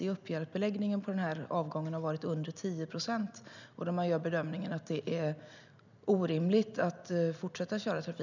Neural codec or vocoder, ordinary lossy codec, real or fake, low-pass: none; none; real; 7.2 kHz